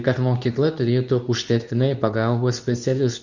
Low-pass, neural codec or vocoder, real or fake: 7.2 kHz; codec, 24 kHz, 0.9 kbps, WavTokenizer, medium speech release version 2; fake